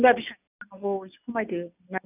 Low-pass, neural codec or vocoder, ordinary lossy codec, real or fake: 3.6 kHz; none; none; real